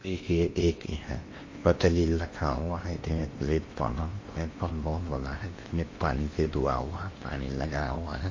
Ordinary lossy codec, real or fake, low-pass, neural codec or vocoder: MP3, 32 kbps; fake; 7.2 kHz; codec, 16 kHz in and 24 kHz out, 0.8 kbps, FocalCodec, streaming, 65536 codes